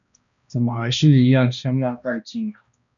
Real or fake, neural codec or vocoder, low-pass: fake; codec, 16 kHz, 1 kbps, X-Codec, HuBERT features, trained on balanced general audio; 7.2 kHz